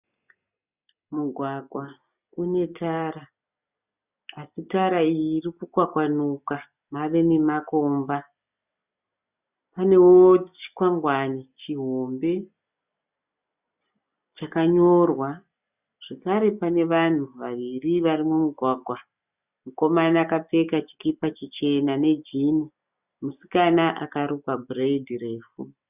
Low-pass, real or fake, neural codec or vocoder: 3.6 kHz; real; none